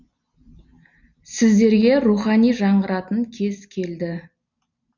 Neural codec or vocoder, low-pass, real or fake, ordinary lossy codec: none; 7.2 kHz; real; none